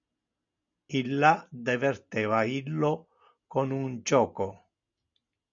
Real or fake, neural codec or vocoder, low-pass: real; none; 7.2 kHz